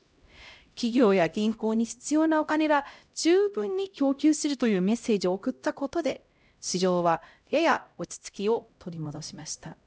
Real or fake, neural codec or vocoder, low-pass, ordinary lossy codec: fake; codec, 16 kHz, 0.5 kbps, X-Codec, HuBERT features, trained on LibriSpeech; none; none